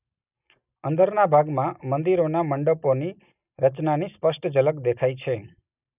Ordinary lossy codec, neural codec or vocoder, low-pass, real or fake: none; none; 3.6 kHz; real